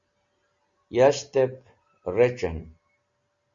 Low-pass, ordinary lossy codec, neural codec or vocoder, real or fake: 7.2 kHz; Opus, 64 kbps; none; real